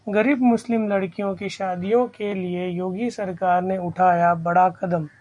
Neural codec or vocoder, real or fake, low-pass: none; real; 10.8 kHz